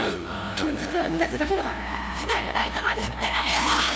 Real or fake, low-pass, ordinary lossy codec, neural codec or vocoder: fake; none; none; codec, 16 kHz, 0.5 kbps, FunCodec, trained on LibriTTS, 25 frames a second